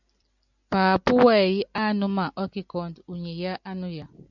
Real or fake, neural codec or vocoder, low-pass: real; none; 7.2 kHz